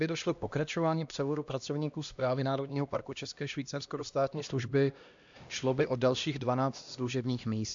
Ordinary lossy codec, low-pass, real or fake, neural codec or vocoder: AAC, 64 kbps; 7.2 kHz; fake; codec, 16 kHz, 1 kbps, X-Codec, HuBERT features, trained on LibriSpeech